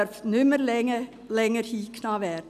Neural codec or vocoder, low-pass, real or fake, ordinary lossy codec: none; 14.4 kHz; real; none